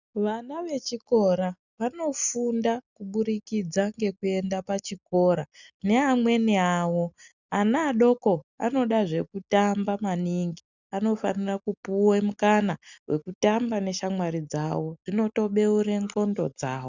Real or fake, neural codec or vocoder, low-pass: real; none; 7.2 kHz